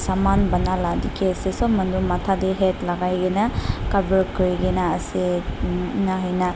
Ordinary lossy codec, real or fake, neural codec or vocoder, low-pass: none; real; none; none